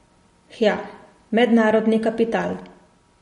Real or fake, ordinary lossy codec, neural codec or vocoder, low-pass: real; MP3, 48 kbps; none; 10.8 kHz